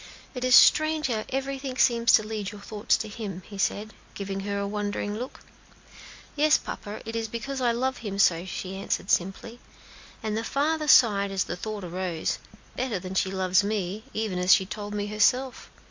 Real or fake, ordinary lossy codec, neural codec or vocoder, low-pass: real; MP3, 48 kbps; none; 7.2 kHz